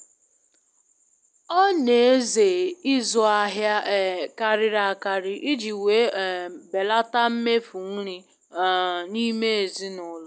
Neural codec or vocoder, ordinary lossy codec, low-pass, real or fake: none; none; none; real